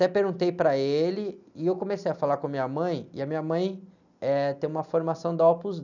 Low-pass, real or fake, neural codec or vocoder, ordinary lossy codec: 7.2 kHz; real; none; none